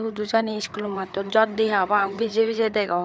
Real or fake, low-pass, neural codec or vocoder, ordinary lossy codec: fake; none; codec, 16 kHz, 4 kbps, FreqCodec, larger model; none